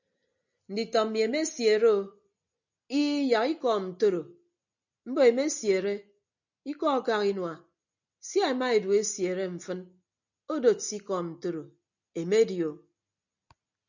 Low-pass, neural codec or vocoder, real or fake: 7.2 kHz; none; real